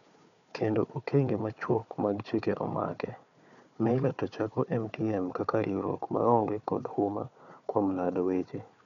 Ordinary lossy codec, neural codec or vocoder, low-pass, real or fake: none; codec, 16 kHz, 4 kbps, FunCodec, trained on Chinese and English, 50 frames a second; 7.2 kHz; fake